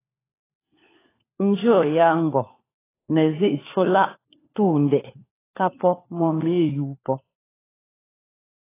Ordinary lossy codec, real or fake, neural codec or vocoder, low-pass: AAC, 16 kbps; fake; codec, 16 kHz, 4 kbps, FunCodec, trained on LibriTTS, 50 frames a second; 3.6 kHz